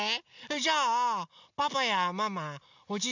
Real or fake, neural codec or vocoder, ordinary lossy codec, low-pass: real; none; none; 7.2 kHz